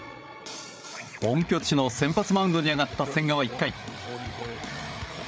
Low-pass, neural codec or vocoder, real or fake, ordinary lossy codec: none; codec, 16 kHz, 8 kbps, FreqCodec, larger model; fake; none